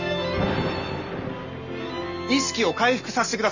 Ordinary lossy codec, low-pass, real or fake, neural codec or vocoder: none; 7.2 kHz; real; none